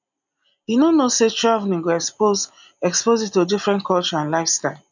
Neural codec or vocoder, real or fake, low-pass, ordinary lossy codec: none; real; 7.2 kHz; none